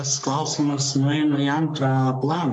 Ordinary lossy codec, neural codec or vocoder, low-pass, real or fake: MP3, 64 kbps; codec, 44.1 kHz, 3.4 kbps, Pupu-Codec; 10.8 kHz; fake